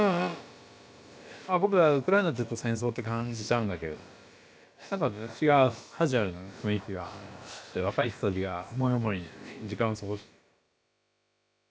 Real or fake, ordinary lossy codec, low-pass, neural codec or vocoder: fake; none; none; codec, 16 kHz, about 1 kbps, DyCAST, with the encoder's durations